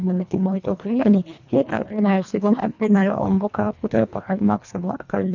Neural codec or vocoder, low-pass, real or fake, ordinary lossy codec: codec, 24 kHz, 1.5 kbps, HILCodec; 7.2 kHz; fake; none